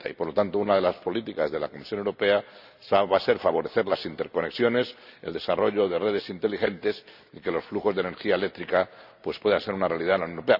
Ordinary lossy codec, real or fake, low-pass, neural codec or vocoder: none; real; 5.4 kHz; none